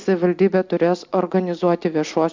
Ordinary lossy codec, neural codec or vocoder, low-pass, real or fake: MP3, 64 kbps; none; 7.2 kHz; real